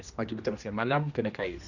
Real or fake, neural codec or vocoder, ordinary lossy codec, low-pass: fake; codec, 16 kHz, 1 kbps, X-Codec, HuBERT features, trained on general audio; none; 7.2 kHz